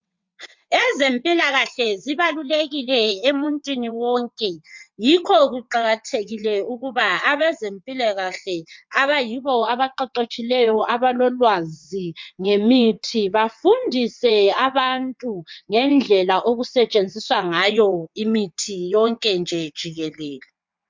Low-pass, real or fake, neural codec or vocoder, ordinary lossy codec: 7.2 kHz; fake; vocoder, 22.05 kHz, 80 mel bands, WaveNeXt; MP3, 64 kbps